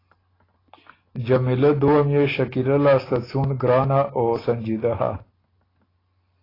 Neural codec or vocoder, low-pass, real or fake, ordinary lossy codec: none; 5.4 kHz; real; AAC, 24 kbps